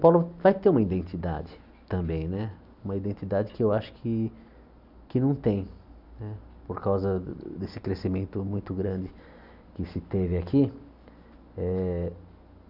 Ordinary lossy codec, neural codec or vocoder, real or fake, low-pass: none; none; real; 5.4 kHz